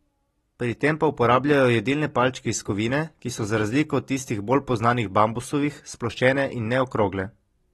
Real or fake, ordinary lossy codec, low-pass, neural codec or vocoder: real; AAC, 32 kbps; 14.4 kHz; none